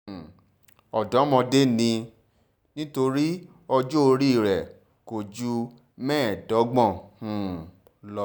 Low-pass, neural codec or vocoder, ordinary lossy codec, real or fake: none; none; none; real